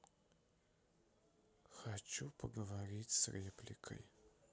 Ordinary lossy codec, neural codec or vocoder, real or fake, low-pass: none; none; real; none